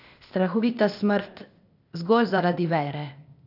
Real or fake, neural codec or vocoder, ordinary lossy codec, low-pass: fake; codec, 16 kHz, 0.8 kbps, ZipCodec; none; 5.4 kHz